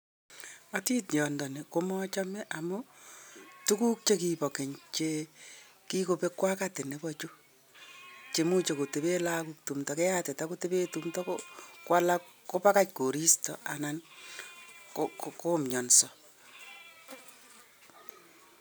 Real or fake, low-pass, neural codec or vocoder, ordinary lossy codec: real; none; none; none